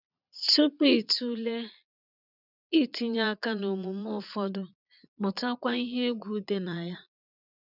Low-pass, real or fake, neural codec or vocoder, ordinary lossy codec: 5.4 kHz; fake; vocoder, 22.05 kHz, 80 mel bands, Vocos; none